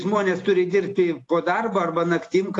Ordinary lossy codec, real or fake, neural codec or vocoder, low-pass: AAC, 48 kbps; real; none; 10.8 kHz